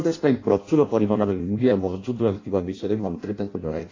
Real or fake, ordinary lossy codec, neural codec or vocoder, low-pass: fake; AAC, 32 kbps; codec, 16 kHz in and 24 kHz out, 0.6 kbps, FireRedTTS-2 codec; 7.2 kHz